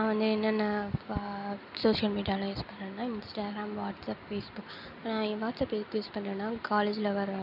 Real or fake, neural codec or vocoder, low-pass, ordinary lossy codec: real; none; 5.4 kHz; none